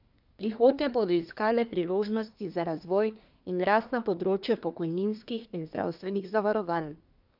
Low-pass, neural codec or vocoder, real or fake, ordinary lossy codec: 5.4 kHz; codec, 24 kHz, 1 kbps, SNAC; fake; none